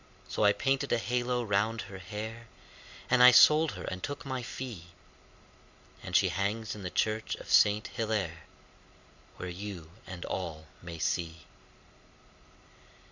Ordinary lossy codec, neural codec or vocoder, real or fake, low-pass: Opus, 64 kbps; none; real; 7.2 kHz